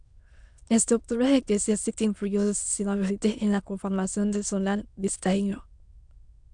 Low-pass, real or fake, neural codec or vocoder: 9.9 kHz; fake; autoencoder, 22.05 kHz, a latent of 192 numbers a frame, VITS, trained on many speakers